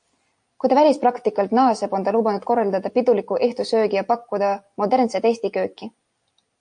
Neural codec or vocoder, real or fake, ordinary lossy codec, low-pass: none; real; AAC, 64 kbps; 9.9 kHz